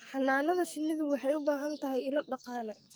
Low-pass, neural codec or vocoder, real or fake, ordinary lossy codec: none; codec, 44.1 kHz, 2.6 kbps, SNAC; fake; none